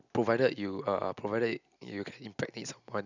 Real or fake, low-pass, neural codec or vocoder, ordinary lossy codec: real; 7.2 kHz; none; none